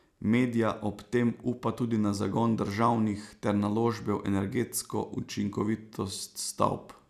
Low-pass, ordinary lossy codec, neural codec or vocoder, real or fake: 14.4 kHz; none; none; real